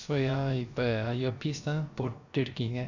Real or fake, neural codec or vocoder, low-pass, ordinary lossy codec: fake; codec, 16 kHz, about 1 kbps, DyCAST, with the encoder's durations; 7.2 kHz; none